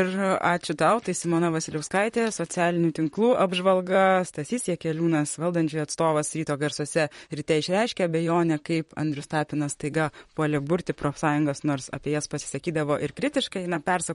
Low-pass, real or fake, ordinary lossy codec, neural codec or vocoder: 19.8 kHz; fake; MP3, 48 kbps; vocoder, 44.1 kHz, 128 mel bands, Pupu-Vocoder